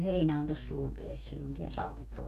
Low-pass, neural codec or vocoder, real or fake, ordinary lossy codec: 14.4 kHz; codec, 44.1 kHz, 2.6 kbps, DAC; fake; none